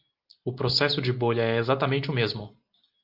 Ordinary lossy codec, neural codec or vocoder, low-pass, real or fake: Opus, 32 kbps; none; 5.4 kHz; real